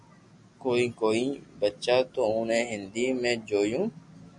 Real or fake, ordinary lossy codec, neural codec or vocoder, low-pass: real; MP3, 96 kbps; none; 10.8 kHz